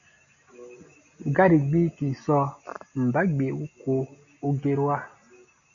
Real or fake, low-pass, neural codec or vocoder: real; 7.2 kHz; none